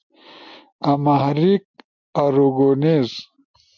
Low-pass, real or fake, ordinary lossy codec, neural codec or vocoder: 7.2 kHz; real; MP3, 64 kbps; none